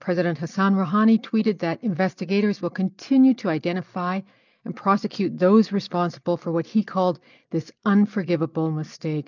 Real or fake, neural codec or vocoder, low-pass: real; none; 7.2 kHz